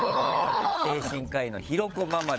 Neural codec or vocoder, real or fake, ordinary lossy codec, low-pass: codec, 16 kHz, 16 kbps, FunCodec, trained on LibriTTS, 50 frames a second; fake; none; none